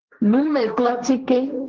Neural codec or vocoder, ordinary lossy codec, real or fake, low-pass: codec, 16 kHz, 1.1 kbps, Voila-Tokenizer; Opus, 16 kbps; fake; 7.2 kHz